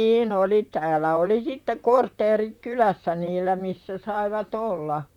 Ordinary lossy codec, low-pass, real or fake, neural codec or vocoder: none; 19.8 kHz; fake; vocoder, 44.1 kHz, 128 mel bands, Pupu-Vocoder